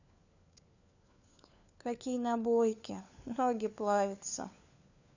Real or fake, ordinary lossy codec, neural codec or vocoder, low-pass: fake; none; codec, 16 kHz, 4 kbps, FunCodec, trained on LibriTTS, 50 frames a second; 7.2 kHz